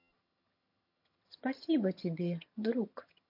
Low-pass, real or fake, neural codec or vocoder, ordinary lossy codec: 5.4 kHz; fake; vocoder, 22.05 kHz, 80 mel bands, HiFi-GAN; MP3, 32 kbps